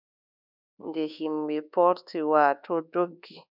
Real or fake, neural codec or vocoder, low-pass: fake; codec, 24 kHz, 1.2 kbps, DualCodec; 5.4 kHz